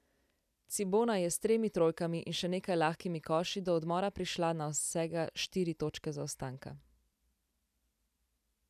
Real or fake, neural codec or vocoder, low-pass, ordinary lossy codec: real; none; 14.4 kHz; none